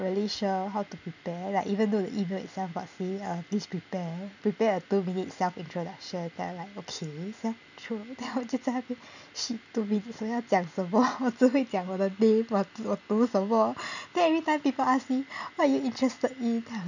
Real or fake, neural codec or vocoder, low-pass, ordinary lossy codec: real; none; 7.2 kHz; none